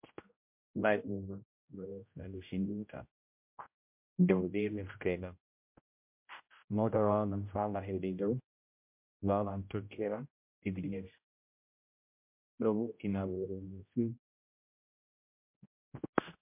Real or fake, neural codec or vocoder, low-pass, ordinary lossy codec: fake; codec, 16 kHz, 0.5 kbps, X-Codec, HuBERT features, trained on general audio; 3.6 kHz; MP3, 32 kbps